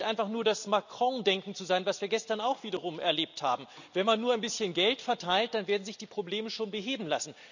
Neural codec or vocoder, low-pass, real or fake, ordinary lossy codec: none; 7.2 kHz; real; none